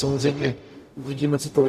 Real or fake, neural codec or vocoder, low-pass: fake; codec, 44.1 kHz, 0.9 kbps, DAC; 14.4 kHz